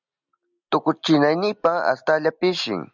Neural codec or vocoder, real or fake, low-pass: none; real; 7.2 kHz